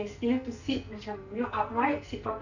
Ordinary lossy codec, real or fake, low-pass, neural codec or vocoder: none; fake; 7.2 kHz; codec, 44.1 kHz, 2.6 kbps, SNAC